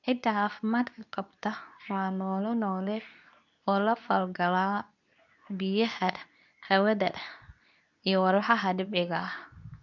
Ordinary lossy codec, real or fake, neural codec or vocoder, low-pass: none; fake; codec, 24 kHz, 0.9 kbps, WavTokenizer, medium speech release version 2; 7.2 kHz